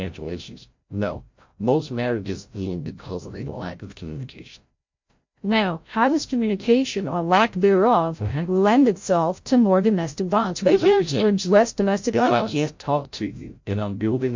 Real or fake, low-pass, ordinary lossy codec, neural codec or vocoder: fake; 7.2 kHz; MP3, 48 kbps; codec, 16 kHz, 0.5 kbps, FreqCodec, larger model